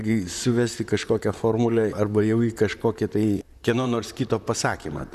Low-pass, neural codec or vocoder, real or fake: 14.4 kHz; vocoder, 44.1 kHz, 128 mel bands, Pupu-Vocoder; fake